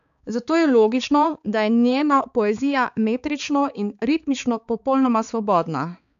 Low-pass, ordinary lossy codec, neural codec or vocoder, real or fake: 7.2 kHz; none; codec, 16 kHz, 4 kbps, X-Codec, HuBERT features, trained on balanced general audio; fake